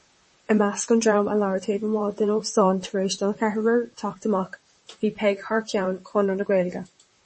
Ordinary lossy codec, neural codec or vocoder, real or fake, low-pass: MP3, 32 kbps; vocoder, 44.1 kHz, 128 mel bands, Pupu-Vocoder; fake; 10.8 kHz